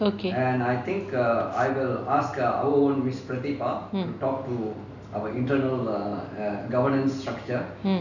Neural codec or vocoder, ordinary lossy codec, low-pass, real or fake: none; none; 7.2 kHz; real